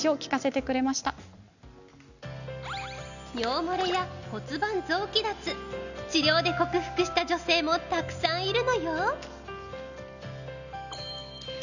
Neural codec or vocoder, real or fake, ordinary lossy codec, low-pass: none; real; none; 7.2 kHz